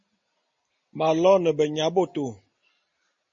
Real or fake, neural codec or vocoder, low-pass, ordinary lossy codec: real; none; 7.2 kHz; MP3, 32 kbps